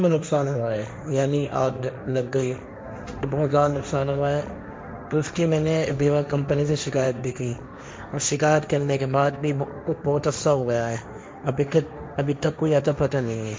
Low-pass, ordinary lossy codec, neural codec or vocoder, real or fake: none; none; codec, 16 kHz, 1.1 kbps, Voila-Tokenizer; fake